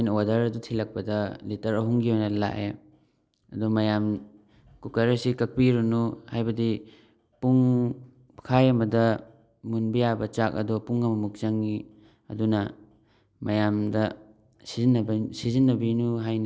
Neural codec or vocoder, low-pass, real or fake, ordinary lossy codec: none; none; real; none